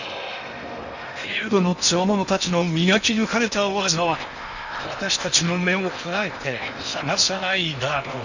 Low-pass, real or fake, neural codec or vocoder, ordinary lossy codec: 7.2 kHz; fake; codec, 16 kHz in and 24 kHz out, 0.8 kbps, FocalCodec, streaming, 65536 codes; none